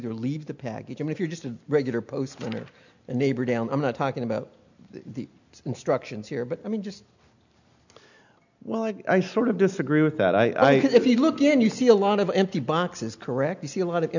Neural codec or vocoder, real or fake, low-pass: none; real; 7.2 kHz